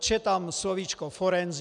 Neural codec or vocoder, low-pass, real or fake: none; 10.8 kHz; real